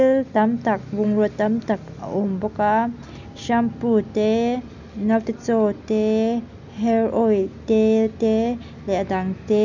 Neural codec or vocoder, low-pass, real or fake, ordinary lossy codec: none; 7.2 kHz; real; none